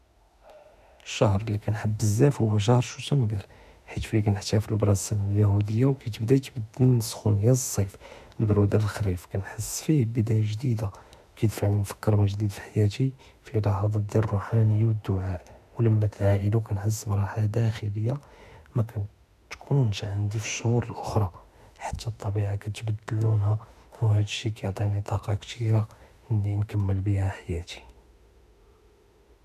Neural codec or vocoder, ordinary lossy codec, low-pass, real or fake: autoencoder, 48 kHz, 32 numbers a frame, DAC-VAE, trained on Japanese speech; none; 14.4 kHz; fake